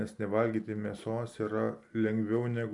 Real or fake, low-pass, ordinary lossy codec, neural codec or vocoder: real; 10.8 kHz; MP3, 64 kbps; none